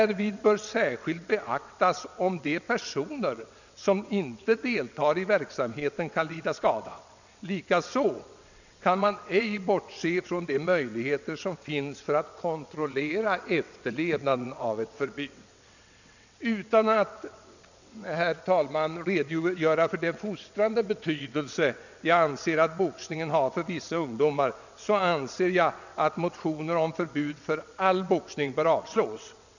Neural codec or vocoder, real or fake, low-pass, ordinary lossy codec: vocoder, 22.05 kHz, 80 mel bands, WaveNeXt; fake; 7.2 kHz; none